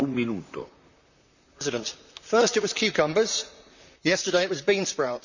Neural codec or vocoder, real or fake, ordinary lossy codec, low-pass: vocoder, 44.1 kHz, 128 mel bands, Pupu-Vocoder; fake; none; 7.2 kHz